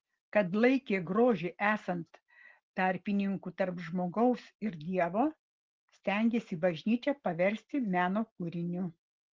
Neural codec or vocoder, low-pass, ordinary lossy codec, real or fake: none; 7.2 kHz; Opus, 16 kbps; real